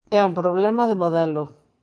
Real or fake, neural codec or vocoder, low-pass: fake; codec, 44.1 kHz, 2.6 kbps, SNAC; 9.9 kHz